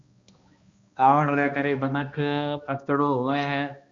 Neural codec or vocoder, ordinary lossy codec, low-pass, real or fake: codec, 16 kHz, 1 kbps, X-Codec, HuBERT features, trained on balanced general audio; Opus, 64 kbps; 7.2 kHz; fake